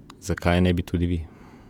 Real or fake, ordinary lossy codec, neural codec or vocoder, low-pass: fake; none; vocoder, 48 kHz, 128 mel bands, Vocos; 19.8 kHz